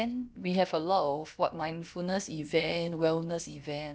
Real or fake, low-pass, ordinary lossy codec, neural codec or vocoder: fake; none; none; codec, 16 kHz, about 1 kbps, DyCAST, with the encoder's durations